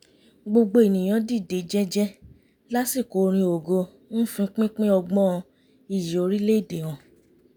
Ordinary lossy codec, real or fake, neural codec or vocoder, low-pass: none; real; none; none